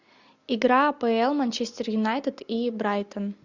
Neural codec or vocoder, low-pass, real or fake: none; 7.2 kHz; real